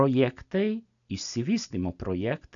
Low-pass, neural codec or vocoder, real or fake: 7.2 kHz; none; real